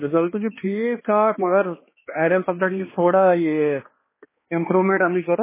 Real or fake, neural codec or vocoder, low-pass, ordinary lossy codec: fake; codec, 16 kHz, 2 kbps, X-Codec, HuBERT features, trained on balanced general audio; 3.6 kHz; MP3, 16 kbps